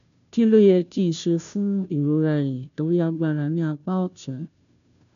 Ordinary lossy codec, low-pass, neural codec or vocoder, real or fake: none; 7.2 kHz; codec, 16 kHz, 0.5 kbps, FunCodec, trained on Chinese and English, 25 frames a second; fake